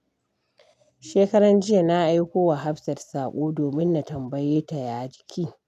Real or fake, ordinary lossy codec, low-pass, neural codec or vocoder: real; none; 14.4 kHz; none